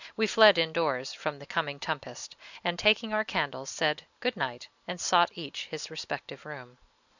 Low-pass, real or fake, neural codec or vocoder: 7.2 kHz; real; none